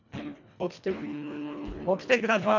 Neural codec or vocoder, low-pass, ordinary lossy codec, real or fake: codec, 24 kHz, 1.5 kbps, HILCodec; 7.2 kHz; none; fake